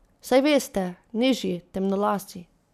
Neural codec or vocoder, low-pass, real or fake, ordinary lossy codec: none; 14.4 kHz; real; none